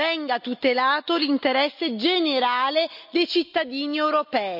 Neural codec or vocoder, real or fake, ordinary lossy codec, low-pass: none; real; none; 5.4 kHz